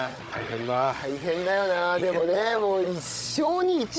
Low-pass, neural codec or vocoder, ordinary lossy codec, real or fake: none; codec, 16 kHz, 16 kbps, FunCodec, trained on Chinese and English, 50 frames a second; none; fake